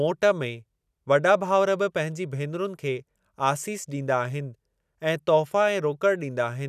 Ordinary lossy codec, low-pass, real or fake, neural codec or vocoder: none; 14.4 kHz; real; none